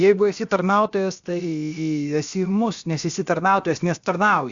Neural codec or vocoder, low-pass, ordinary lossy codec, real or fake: codec, 16 kHz, about 1 kbps, DyCAST, with the encoder's durations; 7.2 kHz; Opus, 64 kbps; fake